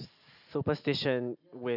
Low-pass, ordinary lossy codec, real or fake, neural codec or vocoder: 5.4 kHz; none; real; none